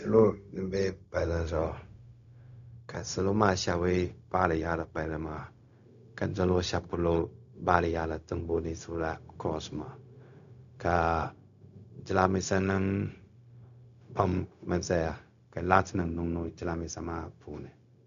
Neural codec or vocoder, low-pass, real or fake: codec, 16 kHz, 0.4 kbps, LongCat-Audio-Codec; 7.2 kHz; fake